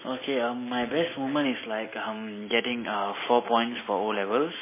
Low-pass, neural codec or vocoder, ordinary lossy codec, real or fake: 3.6 kHz; none; MP3, 16 kbps; real